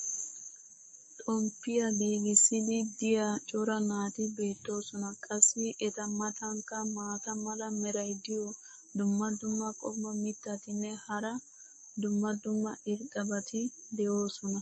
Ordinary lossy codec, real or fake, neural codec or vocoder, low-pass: MP3, 32 kbps; fake; codec, 44.1 kHz, 7.8 kbps, DAC; 9.9 kHz